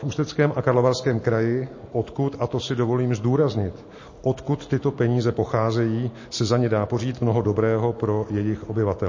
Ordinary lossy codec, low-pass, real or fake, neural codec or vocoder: MP3, 32 kbps; 7.2 kHz; real; none